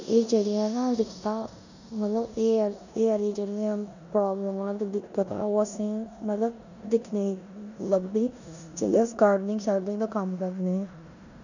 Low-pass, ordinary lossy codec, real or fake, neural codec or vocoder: 7.2 kHz; none; fake; codec, 16 kHz in and 24 kHz out, 0.9 kbps, LongCat-Audio-Codec, four codebook decoder